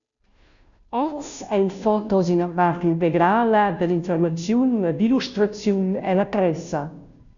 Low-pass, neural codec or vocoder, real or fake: 7.2 kHz; codec, 16 kHz, 0.5 kbps, FunCodec, trained on Chinese and English, 25 frames a second; fake